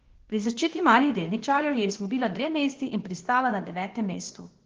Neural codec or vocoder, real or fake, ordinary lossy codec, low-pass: codec, 16 kHz, 0.8 kbps, ZipCodec; fake; Opus, 16 kbps; 7.2 kHz